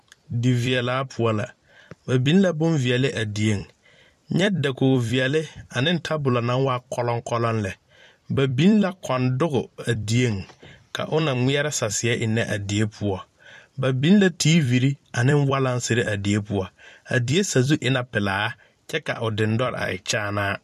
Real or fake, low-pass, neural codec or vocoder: fake; 14.4 kHz; vocoder, 44.1 kHz, 128 mel bands every 256 samples, BigVGAN v2